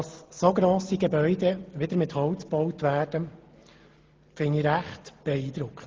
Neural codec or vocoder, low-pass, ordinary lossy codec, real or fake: none; 7.2 kHz; Opus, 16 kbps; real